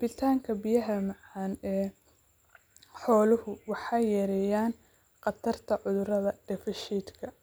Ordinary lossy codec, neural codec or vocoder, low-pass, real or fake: none; none; none; real